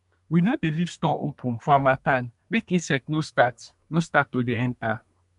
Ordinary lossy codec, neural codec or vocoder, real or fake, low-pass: none; codec, 24 kHz, 1 kbps, SNAC; fake; 10.8 kHz